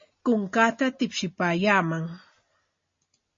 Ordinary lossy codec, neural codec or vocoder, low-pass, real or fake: MP3, 32 kbps; none; 7.2 kHz; real